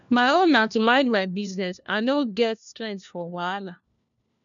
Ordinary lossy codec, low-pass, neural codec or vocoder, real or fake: none; 7.2 kHz; codec, 16 kHz, 1 kbps, FunCodec, trained on LibriTTS, 50 frames a second; fake